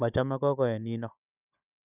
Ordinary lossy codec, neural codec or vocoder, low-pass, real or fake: none; codec, 16 kHz, 16 kbps, FunCodec, trained on Chinese and English, 50 frames a second; 3.6 kHz; fake